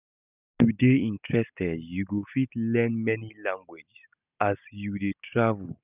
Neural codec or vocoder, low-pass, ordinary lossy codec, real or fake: none; 3.6 kHz; none; real